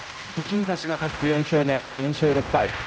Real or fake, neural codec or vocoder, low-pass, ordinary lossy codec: fake; codec, 16 kHz, 0.5 kbps, X-Codec, HuBERT features, trained on general audio; none; none